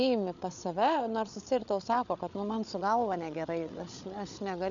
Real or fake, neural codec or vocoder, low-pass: fake; codec, 16 kHz, 8 kbps, FreqCodec, larger model; 7.2 kHz